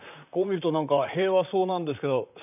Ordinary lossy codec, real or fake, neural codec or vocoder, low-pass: none; fake; vocoder, 44.1 kHz, 128 mel bands, Pupu-Vocoder; 3.6 kHz